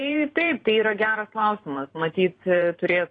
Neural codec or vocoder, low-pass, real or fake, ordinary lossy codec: vocoder, 44.1 kHz, 128 mel bands every 512 samples, BigVGAN v2; 9.9 kHz; fake; AAC, 32 kbps